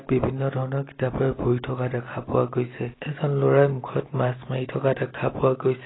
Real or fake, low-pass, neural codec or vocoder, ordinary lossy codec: real; 7.2 kHz; none; AAC, 16 kbps